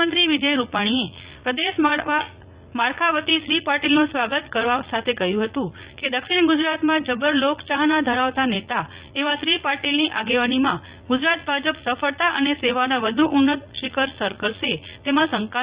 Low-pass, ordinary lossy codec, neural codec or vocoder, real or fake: 3.6 kHz; Opus, 64 kbps; vocoder, 44.1 kHz, 80 mel bands, Vocos; fake